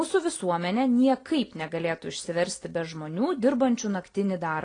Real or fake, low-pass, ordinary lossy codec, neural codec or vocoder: real; 9.9 kHz; AAC, 32 kbps; none